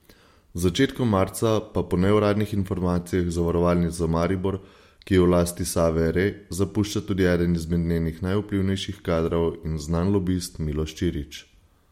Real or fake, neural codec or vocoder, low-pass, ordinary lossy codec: real; none; 19.8 kHz; MP3, 64 kbps